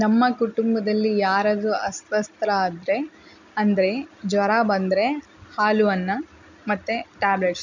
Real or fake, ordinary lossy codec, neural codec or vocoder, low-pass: real; none; none; 7.2 kHz